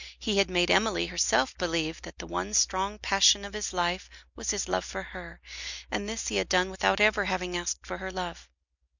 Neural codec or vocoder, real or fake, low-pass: none; real; 7.2 kHz